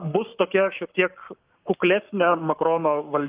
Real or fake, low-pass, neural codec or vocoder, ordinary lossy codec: fake; 3.6 kHz; codec, 44.1 kHz, 7.8 kbps, Pupu-Codec; Opus, 24 kbps